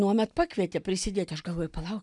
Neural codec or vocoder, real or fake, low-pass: none; real; 10.8 kHz